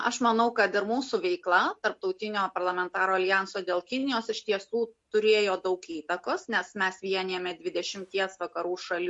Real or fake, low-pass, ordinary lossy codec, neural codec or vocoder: real; 10.8 kHz; MP3, 48 kbps; none